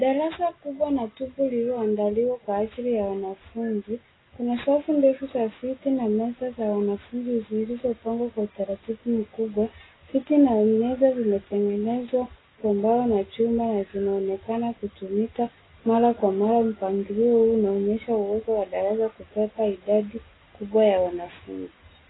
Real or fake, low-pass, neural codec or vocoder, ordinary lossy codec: real; 7.2 kHz; none; AAC, 16 kbps